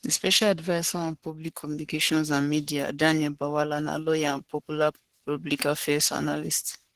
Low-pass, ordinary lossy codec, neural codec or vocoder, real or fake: 14.4 kHz; Opus, 16 kbps; codec, 44.1 kHz, 3.4 kbps, Pupu-Codec; fake